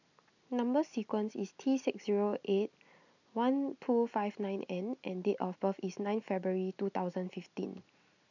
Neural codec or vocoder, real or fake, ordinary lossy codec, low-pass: none; real; none; 7.2 kHz